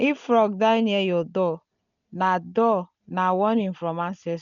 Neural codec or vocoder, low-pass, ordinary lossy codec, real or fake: none; 7.2 kHz; none; real